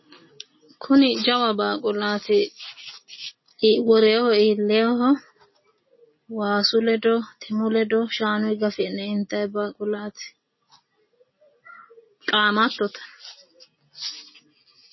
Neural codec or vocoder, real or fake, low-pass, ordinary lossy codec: none; real; 7.2 kHz; MP3, 24 kbps